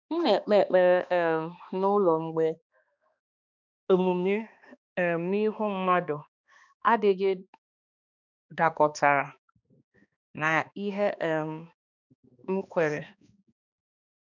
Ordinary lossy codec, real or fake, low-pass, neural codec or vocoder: none; fake; 7.2 kHz; codec, 16 kHz, 2 kbps, X-Codec, HuBERT features, trained on balanced general audio